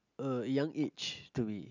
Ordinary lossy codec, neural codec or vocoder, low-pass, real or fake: none; none; 7.2 kHz; real